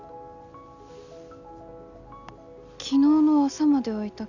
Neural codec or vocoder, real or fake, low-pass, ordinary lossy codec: none; real; 7.2 kHz; none